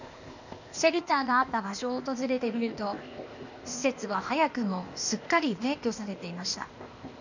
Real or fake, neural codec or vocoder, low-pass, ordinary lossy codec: fake; codec, 16 kHz, 0.8 kbps, ZipCodec; 7.2 kHz; none